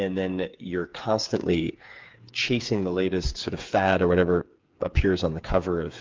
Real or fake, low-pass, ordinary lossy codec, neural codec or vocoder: fake; 7.2 kHz; Opus, 32 kbps; codec, 16 kHz, 8 kbps, FreqCodec, smaller model